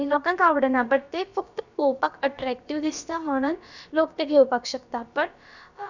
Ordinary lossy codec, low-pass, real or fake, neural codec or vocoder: none; 7.2 kHz; fake; codec, 16 kHz, about 1 kbps, DyCAST, with the encoder's durations